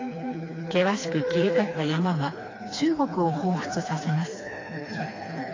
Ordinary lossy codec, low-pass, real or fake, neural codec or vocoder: AAC, 32 kbps; 7.2 kHz; fake; codec, 16 kHz, 2 kbps, FreqCodec, smaller model